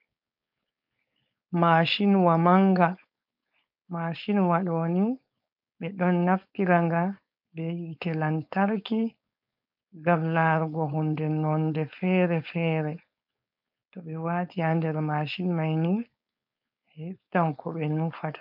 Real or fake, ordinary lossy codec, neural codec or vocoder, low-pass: fake; MP3, 48 kbps; codec, 16 kHz, 4.8 kbps, FACodec; 5.4 kHz